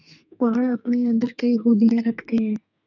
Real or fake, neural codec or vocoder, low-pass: fake; codec, 32 kHz, 1.9 kbps, SNAC; 7.2 kHz